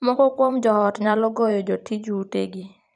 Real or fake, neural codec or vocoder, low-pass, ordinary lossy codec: fake; vocoder, 44.1 kHz, 128 mel bands every 512 samples, BigVGAN v2; 10.8 kHz; none